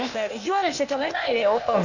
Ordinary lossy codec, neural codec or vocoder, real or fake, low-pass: none; codec, 16 kHz, 0.8 kbps, ZipCodec; fake; 7.2 kHz